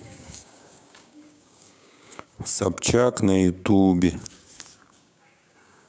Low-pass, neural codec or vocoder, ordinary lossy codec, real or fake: none; codec, 16 kHz, 6 kbps, DAC; none; fake